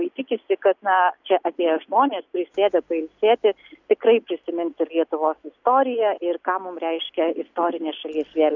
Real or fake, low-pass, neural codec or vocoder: real; 7.2 kHz; none